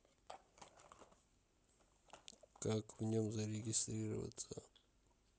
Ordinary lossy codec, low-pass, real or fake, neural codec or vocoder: none; none; real; none